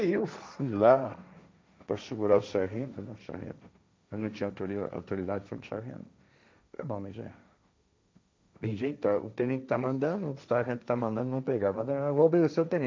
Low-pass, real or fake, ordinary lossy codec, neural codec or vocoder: none; fake; none; codec, 16 kHz, 1.1 kbps, Voila-Tokenizer